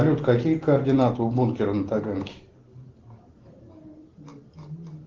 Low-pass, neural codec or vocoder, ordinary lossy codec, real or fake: 7.2 kHz; none; Opus, 16 kbps; real